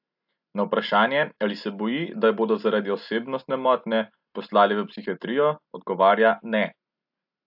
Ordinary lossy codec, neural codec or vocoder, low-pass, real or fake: AAC, 48 kbps; none; 5.4 kHz; real